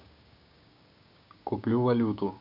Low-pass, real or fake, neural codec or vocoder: 5.4 kHz; fake; codec, 16 kHz, 6 kbps, DAC